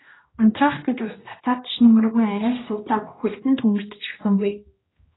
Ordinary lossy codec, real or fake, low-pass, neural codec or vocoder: AAC, 16 kbps; fake; 7.2 kHz; codec, 16 kHz, 1 kbps, X-Codec, HuBERT features, trained on general audio